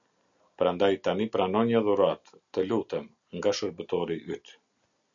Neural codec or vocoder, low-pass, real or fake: none; 7.2 kHz; real